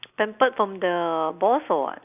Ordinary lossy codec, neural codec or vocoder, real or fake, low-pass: none; none; real; 3.6 kHz